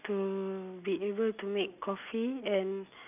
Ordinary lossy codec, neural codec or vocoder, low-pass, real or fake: none; none; 3.6 kHz; real